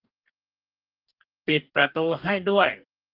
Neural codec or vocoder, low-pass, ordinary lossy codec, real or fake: codec, 44.1 kHz, 2.6 kbps, DAC; 5.4 kHz; Opus, 32 kbps; fake